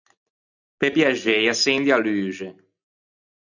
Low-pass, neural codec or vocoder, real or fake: 7.2 kHz; none; real